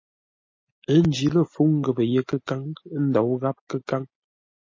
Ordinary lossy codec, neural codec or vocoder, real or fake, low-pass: MP3, 32 kbps; none; real; 7.2 kHz